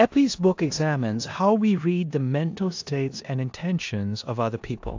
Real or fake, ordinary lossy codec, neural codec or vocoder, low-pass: fake; AAC, 48 kbps; codec, 16 kHz in and 24 kHz out, 0.9 kbps, LongCat-Audio-Codec, four codebook decoder; 7.2 kHz